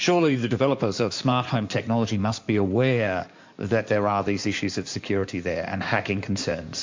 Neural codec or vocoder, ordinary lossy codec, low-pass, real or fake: codec, 16 kHz in and 24 kHz out, 2.2 kbps, FireRedTTS-2 codec; MP3, 48 kbps; 7.2 kHz; fake